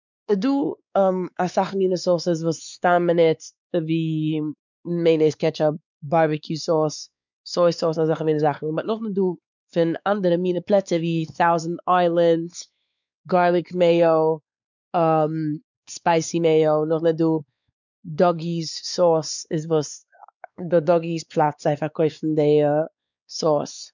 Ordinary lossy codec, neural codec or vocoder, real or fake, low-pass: none; codec, 16 kHz, 4 kbps, X-Codec, WavLM features, trained on Multilingual LibriSpeech; fake; 7.2 kHz